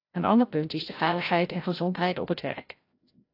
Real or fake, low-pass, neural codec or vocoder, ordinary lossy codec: fake; 5.4 kHz; codec, 16 kHz, 0.5 kbps, FreqCodec, larger model; AAC, 32 kbps